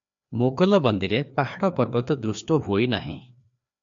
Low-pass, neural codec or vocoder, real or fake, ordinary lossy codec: 7.2 kHz; codec, 16 kHz, 2 kbps, FreqCodec, larger model; fake; MP3, 64 kbps